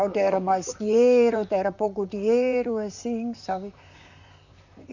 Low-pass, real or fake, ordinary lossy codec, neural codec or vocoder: 7.2 kHz; fake; none; vocoder, 44.1 kHz, 128 mel bands, Pupu-Vocoder